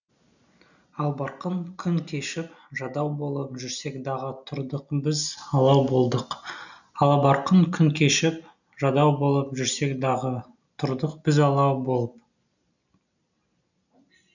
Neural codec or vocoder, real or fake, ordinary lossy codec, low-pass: none; real; none; 7.2 kHz